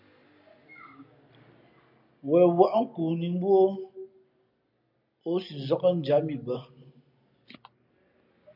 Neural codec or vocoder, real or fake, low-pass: none; real; 5.4 kHz